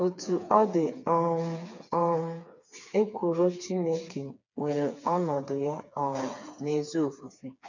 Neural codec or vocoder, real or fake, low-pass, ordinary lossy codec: codec, 16 kHz, 4 kbps, FreqCodec, smaller model; fake; 7.2 kHz; none